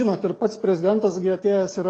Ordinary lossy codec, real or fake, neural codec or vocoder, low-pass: AAC, 32 kbps; fake; codec, 44.1 kHz, 7.8 kbps, DAC; 9.9 kHz